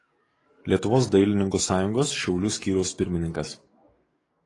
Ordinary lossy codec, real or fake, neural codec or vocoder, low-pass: AAC, 32 kbps; fake; codec, 44.1 kHz, 7.8 kbps, DAC; 10.8 kHz